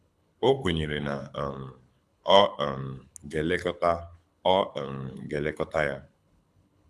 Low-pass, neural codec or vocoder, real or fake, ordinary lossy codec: none; codec, 24 kHz, 6 kbps, HILCodec; fake; none